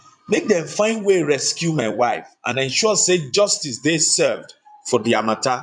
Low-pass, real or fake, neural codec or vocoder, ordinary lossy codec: 9.9 kHz; fake; vocoder, 22.05 kHz, 80 mel bands, Vocos; none